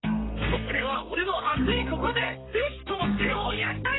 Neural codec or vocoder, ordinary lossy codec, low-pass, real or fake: codec, 32 kHz, 1.9 kbps, SNAC; AAC, 16 kbps; 7.2 kHz; fake